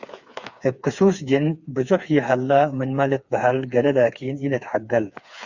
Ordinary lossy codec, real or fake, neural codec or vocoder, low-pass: Opus, 64 kbps; fake; codec, 16 kHz, 4 kbps, FreqCodec, smaller model; 7.2 kHz